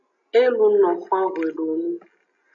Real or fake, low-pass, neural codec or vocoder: real; 7.2 kHz; none